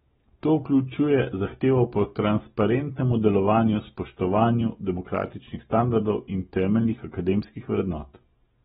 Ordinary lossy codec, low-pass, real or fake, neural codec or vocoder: AAC, 16 kbps; 19.8 kHz; real; none